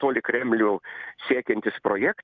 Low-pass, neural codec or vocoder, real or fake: 7.2 kHz; none; real